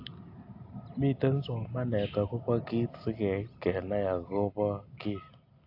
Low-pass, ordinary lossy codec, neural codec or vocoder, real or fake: 5.4 kHz; AAC, 32 kbps; none; real